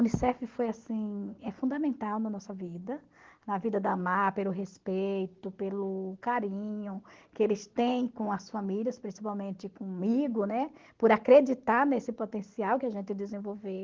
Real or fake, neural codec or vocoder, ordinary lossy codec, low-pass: real; none; Opus, 16 kbps; 7.2 kHz